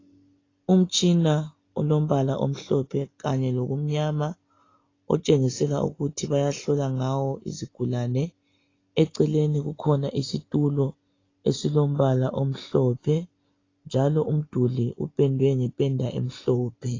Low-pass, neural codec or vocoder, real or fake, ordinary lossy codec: 7.2 kHz; none; real; AAC, 32 kbps